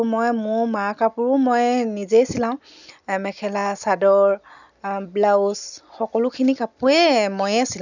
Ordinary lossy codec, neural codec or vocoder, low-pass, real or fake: none; none; 7.2 kHz; real